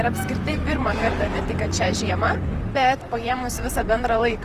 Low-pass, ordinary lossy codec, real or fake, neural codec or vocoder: 14.4 kHz; Opus, 24 kbps; fake; vocoder, 44.1 kHz, 128 mel bands, Pupu-Vocoder